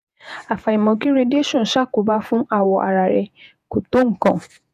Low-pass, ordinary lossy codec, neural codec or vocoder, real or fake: 14.4 kHz; none; vocoder, 48 kHz, 128 mel bands, Vocos; fake